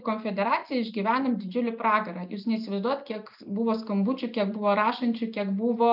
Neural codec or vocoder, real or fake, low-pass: none; real; 5.4 kHz